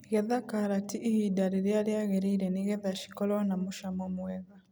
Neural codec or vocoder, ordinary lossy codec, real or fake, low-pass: none; none; real; none